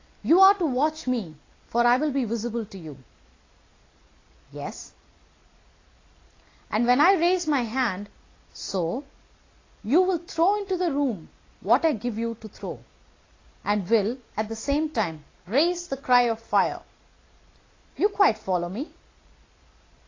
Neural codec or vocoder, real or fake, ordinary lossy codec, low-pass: none; real; AAC, 32 kbps; 7.2 kHz